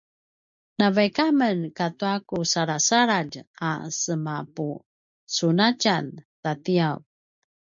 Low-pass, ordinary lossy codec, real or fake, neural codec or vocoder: 7.2 kHz; MP3, 64 kbps; real; none